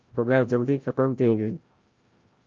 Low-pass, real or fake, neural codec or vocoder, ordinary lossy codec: 7.2 kHz; fake; codec, 16 kHz, 0.5 kbps, FreqCodec, larger model; Opus, 24 kbps